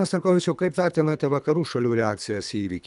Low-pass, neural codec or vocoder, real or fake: 10.8 kHz; codec, 24 kHz, 3 kbps, HILCodec; fake